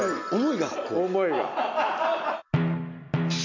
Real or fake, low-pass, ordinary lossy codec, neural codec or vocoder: real; 7.2 kHz; MP3, 64 kbps; none